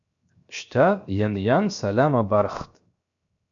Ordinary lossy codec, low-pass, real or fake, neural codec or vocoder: MP3, 64 kbps; 7.2 kHz; fake; codec, 16 kHz, 0.7 kbps, FocalCodec